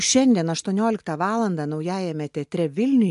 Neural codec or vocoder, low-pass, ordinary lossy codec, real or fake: none; 10.8 kHz; MP3, 64 kbps; real